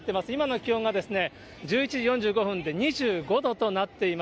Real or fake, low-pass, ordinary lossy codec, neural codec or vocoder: real; none; none; none